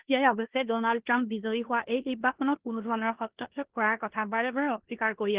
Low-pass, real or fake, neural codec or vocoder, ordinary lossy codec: 3.6 kHz; fake; codec, 16 kHz in and 24 kHz out, 0.9 kbps, LongCat-Audio-Codec, four codebook decoder; Opus, 16 kbps